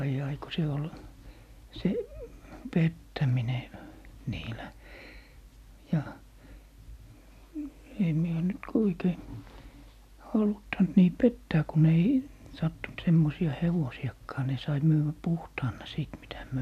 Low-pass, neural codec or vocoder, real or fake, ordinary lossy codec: 14.4 kHz; none; real; none